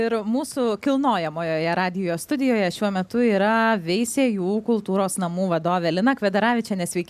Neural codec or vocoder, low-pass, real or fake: none; 14.4 kHz; real